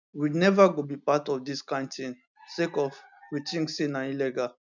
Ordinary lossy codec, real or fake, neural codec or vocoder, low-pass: none; real; none; 7.2 kHz